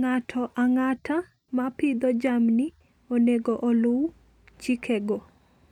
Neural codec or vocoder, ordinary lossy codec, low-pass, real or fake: none; none; 19.8 kHz; real